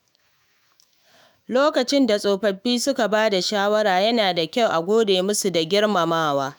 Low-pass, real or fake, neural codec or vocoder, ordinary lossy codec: none; fake; autoencoder, 48 kHz, 128 numbers a frame, DAC-VAE, trained on Japanese speech; none